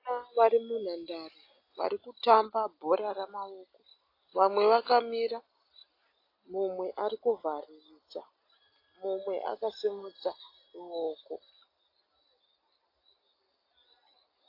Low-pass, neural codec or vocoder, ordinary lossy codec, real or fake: 5.4 kHz; none; AAC, 32 kbps; real